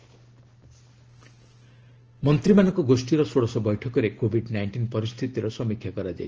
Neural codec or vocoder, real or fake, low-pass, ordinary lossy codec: none; real; 7.2 kHz; Opus, 16 kbps